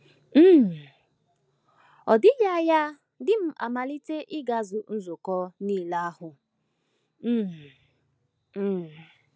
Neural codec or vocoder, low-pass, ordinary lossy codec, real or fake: none; none; none; real